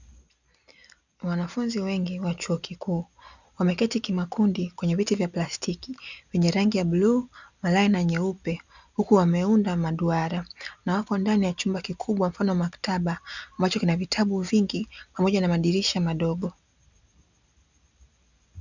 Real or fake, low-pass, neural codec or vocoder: real; 7.2 kHz; none